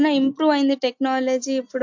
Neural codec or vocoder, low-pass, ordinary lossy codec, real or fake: none; 7.2 kHz; MP3, 48 kbps; real